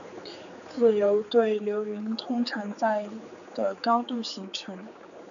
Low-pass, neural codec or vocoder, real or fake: 7.2 kHz; codec, 16 kHz, 4 kbps, X-Codec, HuBERT features, trained on general audio; fake